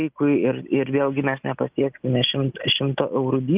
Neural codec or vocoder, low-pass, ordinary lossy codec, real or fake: none; 3.6 kHz; Opus, 24 kbps; real